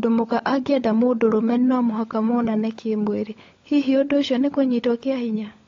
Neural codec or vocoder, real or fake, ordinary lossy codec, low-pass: codec, 16 kHz, 16 kbps, FunCodec, trained on LibriTTS, 50 frames a second; fake; AAC, 32 kbps; 7.2 kHz